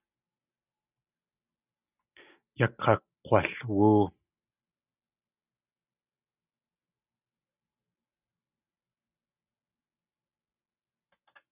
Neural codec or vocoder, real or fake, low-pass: none; real; 3.6 kHz